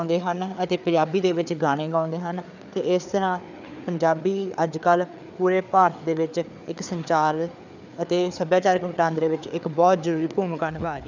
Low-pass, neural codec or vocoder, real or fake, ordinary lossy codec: 7.2 kHz; codec, 16 kHz, 4 kbps, FreqCodec, larger model; fake; none